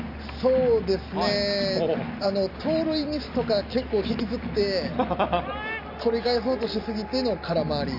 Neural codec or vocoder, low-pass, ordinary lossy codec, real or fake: none; 5.4 kHz; AAC, 48 kbps; real